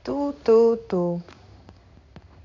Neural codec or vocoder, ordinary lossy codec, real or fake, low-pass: codec, 16 kHz in and 24 kHz out, 1 kbps, XY-Tokenizer; AAC, 48 kbps; fake; 7.2 kHz